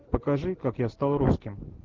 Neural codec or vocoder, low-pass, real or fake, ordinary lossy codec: none; 7.2 kHz; real; Opus, 16 kbps